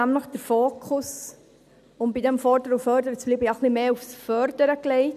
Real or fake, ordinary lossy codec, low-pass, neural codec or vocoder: real; none; 14.4 kHz; none